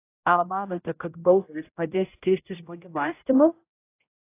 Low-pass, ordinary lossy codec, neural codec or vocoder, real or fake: 3.6 kHz; AAC, 24 kbps; codec, 16 kHz, 0.5 kbps, X-Codec, HuBERT features, trained on general audio; fake